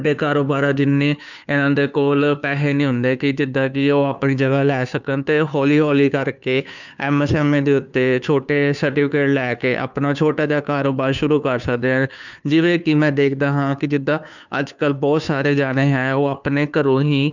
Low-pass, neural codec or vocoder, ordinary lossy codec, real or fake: 7.2 kHz; codec, 16 kHz, 2 kbps, FunCodec, trained on Chinese and English, 25 frames a second; none; fake